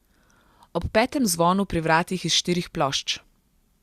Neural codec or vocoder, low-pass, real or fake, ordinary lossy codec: none; 14.4 kHz; real; Opus, 64 kbps